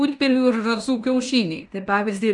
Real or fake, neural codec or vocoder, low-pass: fake; codec, 16 kHz in and 24 kHz out, 0.9 kbps, LongCat-Audio-Codec, fine tuned four codebook decoder; 10.8 kHz